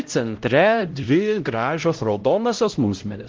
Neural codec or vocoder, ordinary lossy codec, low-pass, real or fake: codec, 16 kHz, 0.5 kbps, X-Codec, HuBERT features, trained on LibriSpeech; Opus, 16 kbps; 7.2 kHz; fake